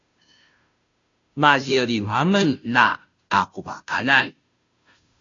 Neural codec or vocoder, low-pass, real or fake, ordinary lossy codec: codec, 16 kHz, 0.5 kbps, FunCodec, trained on Chinese and English, 25 frames a second; 7.2 kHz; fake; AAC, 48 kbps